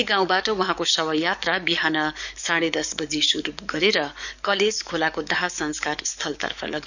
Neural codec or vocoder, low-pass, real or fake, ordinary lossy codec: codec, 44.1 kHz, 7.8 kbps, DAC; 7.2 kHz; fake; none